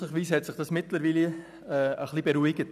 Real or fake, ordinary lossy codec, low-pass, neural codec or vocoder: real; none; 14.4 kHz; none